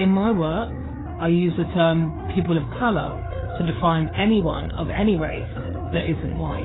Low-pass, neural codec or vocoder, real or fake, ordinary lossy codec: 7.2 kHz; codec, 16 kHz, 4 kbps, FreqCodec, larger model; fake; AAC, 16 kbps